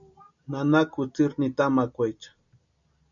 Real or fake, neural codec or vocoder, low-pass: real; none; 7.2 kHz